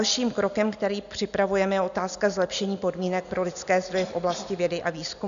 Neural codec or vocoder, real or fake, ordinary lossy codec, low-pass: none; real; MP3, 96 kbps; 7.2 kHz